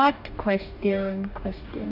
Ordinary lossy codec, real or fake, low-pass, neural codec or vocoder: none; fake; 5.4 kHz; codec, 44.1 kHz, 2.6 kbps, DAC